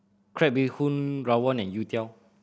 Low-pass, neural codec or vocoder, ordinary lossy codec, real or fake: none; none; none; real